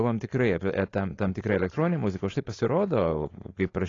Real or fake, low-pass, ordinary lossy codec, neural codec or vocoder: fake; 7.2 kHz; AAC, 32 kbps; codec, 16 kHz, 4.8 kbps, FACodec